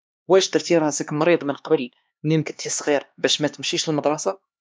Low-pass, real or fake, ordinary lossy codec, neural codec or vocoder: none; fake; none; codec, 16 kHz, 2 kbps, X-Codec, HuBERT features, trained on LibriSpeech